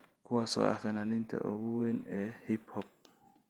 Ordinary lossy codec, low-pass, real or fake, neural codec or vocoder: Opus, 24 kbps; 19.8 kHz; fake; vocoder, 48 kHz, 128 mel bands, Vocos